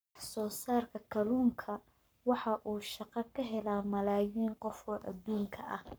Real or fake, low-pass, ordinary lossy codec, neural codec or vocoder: fake; none; none; codec, 44.1 kHz, 7.8 kbps, Pupu-Codec